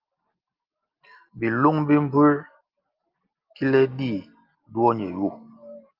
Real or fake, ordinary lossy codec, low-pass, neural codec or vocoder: real; Opus, 32 kbps; 5.4 kHz; none